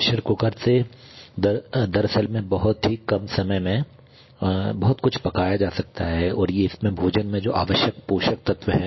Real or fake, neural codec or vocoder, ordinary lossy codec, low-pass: real; none; MP3, 24 kbps; 7.2 kHz